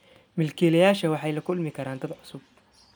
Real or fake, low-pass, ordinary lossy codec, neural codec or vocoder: real; none; none; none